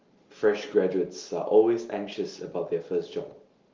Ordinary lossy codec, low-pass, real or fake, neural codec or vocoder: Opus, 32 kbps; 7.2 kHz; real; none